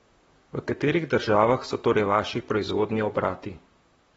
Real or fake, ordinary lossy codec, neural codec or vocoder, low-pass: fake; AAC, 24 kbps; vocoder, 44.1 kHz, 128 mel bands, Pupu-Vocoder; 19.8 kHz